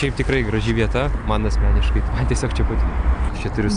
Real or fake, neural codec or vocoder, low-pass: real; none; 9.9 kHz